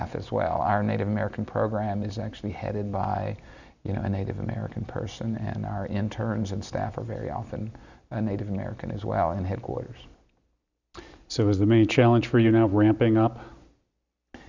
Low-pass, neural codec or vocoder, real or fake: 7.2 kHz; none; real